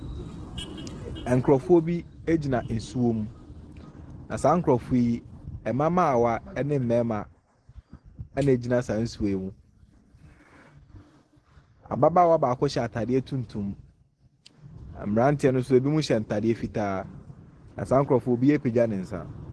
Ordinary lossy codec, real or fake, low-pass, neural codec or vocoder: Opus, 16 kbps; real; 10.8 kHz; none